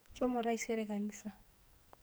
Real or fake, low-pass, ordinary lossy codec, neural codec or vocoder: fake; none; none; codec, 44.1 kHz, 2.6 kbps, SNAC